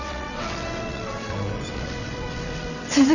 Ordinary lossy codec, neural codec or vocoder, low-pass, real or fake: none; vocoder, 22.05 kHz, 80 mel bands, WaveNeXt; 7.2 kHz; fake